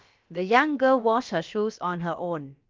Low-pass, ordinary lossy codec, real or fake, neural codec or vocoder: 7.2 kHz; Opus, 32 kbps; fake; codec, 16 kHz, about 1 kbps, DyCAST, with the encoder's durations